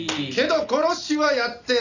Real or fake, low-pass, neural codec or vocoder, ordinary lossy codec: real; 7.2 kHz; none; none